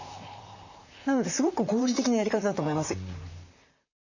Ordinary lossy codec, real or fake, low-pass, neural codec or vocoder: none; fake; 7.2 kHz; vocoder, 44.1 kHz, 128 mel bands, Pupu-Vocoder